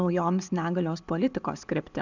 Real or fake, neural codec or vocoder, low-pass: real; none; 7.2 kHz